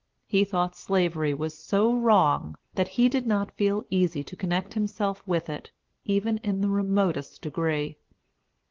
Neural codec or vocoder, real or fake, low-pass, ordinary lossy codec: none; real; 7.2 kHz; Opus, 16 kbps